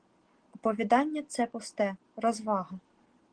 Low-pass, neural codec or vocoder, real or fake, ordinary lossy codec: 9.9 kHz; none; real; Opus, 16 kbps